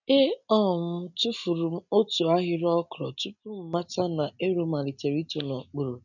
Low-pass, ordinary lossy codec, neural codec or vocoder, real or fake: 7.2 kHz; none; none; real